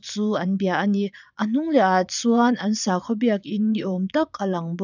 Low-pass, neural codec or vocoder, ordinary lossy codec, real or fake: 7.2 kHz; vocoder, 22.05 kHz, 80 mel bands, Vocos; none; fake